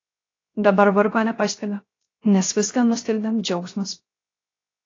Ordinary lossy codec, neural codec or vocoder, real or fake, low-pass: AAC, 32 kbps; codec, 16 kHz, 0.3 kbps, FocalCodec; fake; 7.2 kHz